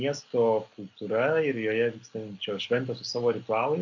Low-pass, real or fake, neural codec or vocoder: 7.2 kHz; real; none